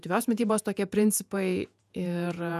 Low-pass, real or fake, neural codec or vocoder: 14.4 kHz; fake; vocoder, 48 kHz, 128 mel bands, Vocos